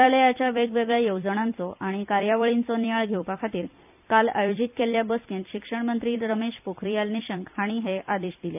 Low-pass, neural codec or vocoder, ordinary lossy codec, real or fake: 3.6 kHz; vocoder, 44.1 kHz, 128 mel bands every 256 samples, BigVGAN v2; none; fake